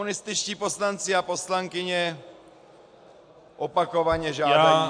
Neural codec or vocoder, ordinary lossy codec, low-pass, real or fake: none; AAC, 64 kbps; 9.9 kHz; real